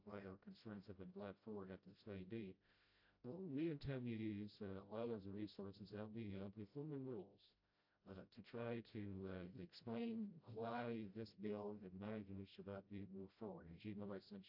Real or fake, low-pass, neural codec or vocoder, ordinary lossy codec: fake; 5.4 kHz; codec, 16 kHz, 0.5 kbps, FreqCodec, smaller model; AAC, 48 kbps